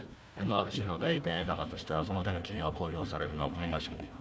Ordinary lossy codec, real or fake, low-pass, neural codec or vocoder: none; fake; none; codec, 16 kHz, 1 kbps, FunCodec, trained on Chinese and English, 50 frames a second